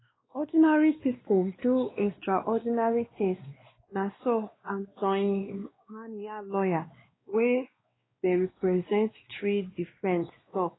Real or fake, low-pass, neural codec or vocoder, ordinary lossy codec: fake; 7.2 kHz; codec, 16 kHz, 2 kbps, X-Codec, WavLM features, trained on Multilingual LibriSpeech; AAC, 16 kbps